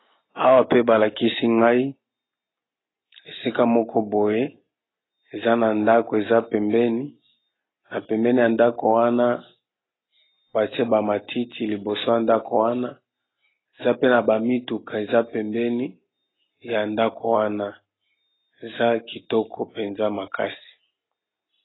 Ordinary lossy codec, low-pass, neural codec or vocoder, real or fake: AAC, 16 kbps; 7.2 kHz; none; real